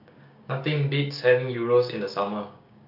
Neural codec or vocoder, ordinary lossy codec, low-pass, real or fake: codec, 16 kHz, 6 kbps, DAC; AAC, 48 kbps; 5.4 kHz; fake